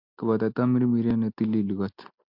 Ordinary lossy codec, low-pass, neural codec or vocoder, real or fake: MP3, 48 kbps; 5.4 kHz; none; real